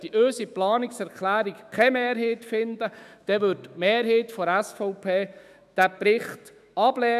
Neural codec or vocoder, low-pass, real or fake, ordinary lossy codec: autoencoder, 48 kHz, 128 numbers a frame, DAC-VAE, trained on Japanese speech; 14.4 kHz; fake; none